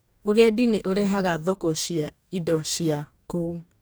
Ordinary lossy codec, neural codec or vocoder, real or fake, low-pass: none; codec, 44.1 kHz, 2.6 kbps, DAC; fake; none